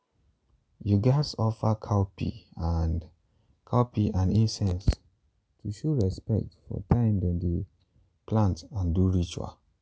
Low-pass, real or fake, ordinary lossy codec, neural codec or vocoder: none; real; none; none